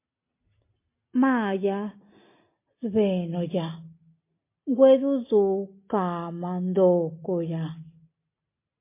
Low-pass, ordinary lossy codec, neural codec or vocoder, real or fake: 3.6 kHz; MP3, 24 kbps; none; real